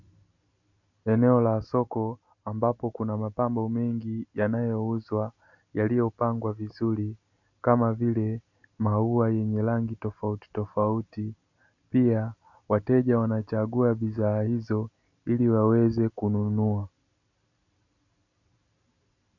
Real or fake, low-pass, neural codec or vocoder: real; 7.2 kHz; none